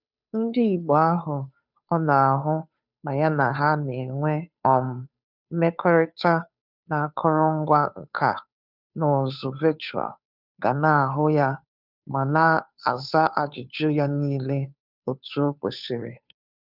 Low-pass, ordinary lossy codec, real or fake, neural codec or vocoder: 5.4 kHz; none; fake; codec, 16 kHz, 2 kbps, FunCodec, trained on Chinese and English, 25 frames a second